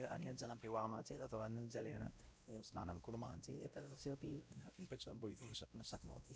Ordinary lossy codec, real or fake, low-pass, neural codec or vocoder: none; fake; none; codec, 16 kHz, 0.5 kbps, X-Codec, WavLM features, trained on Multilingual LibriSpeech